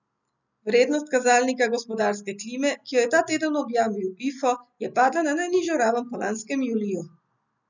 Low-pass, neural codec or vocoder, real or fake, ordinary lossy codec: 7.2 kHz; none; real; none